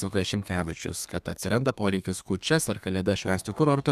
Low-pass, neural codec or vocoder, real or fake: 14.4 kHz; codec, 32 kHz, 1.9 kbps, SNAC; fake